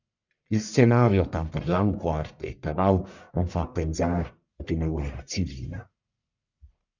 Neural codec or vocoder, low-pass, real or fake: codec, 44.1 kHz, 1.7 kbps, Pupu-Codec; 7.2 kHz; fake